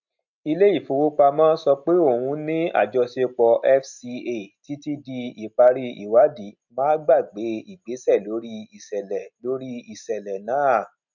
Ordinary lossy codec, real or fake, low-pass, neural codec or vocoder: none; real; 7.2 kHz; none